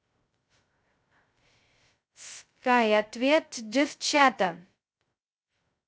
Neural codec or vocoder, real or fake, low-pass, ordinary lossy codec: codec, 16 kHz, 0.2 kbps, FocalCodec; fake; none; none